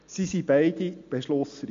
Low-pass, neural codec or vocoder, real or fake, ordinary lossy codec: 7.2 kHz; none; real; MP3, 48 kbps